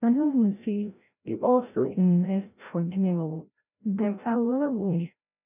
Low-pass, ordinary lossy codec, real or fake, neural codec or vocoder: 3.6 kHz; none; fake; codec, 16 kHz, 0.5 kbps, FreqCodec, larger model